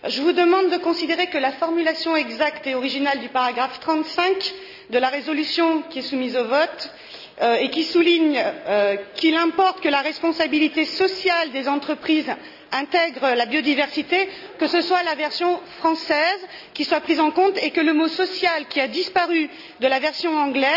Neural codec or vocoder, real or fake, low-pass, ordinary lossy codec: none; real; 5.4 kHz; none